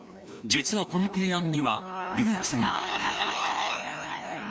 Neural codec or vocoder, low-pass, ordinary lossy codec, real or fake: codec, 16 kHz, 1 kbps, FreqCodec, larger model; none; none; fake